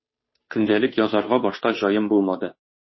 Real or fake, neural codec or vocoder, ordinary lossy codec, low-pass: fake; codec, 16 kHz, 2 kbps, FunCodec, trained on Chinese and English, 25 frames a second; MP3, 24 kbps; 7.2 kHz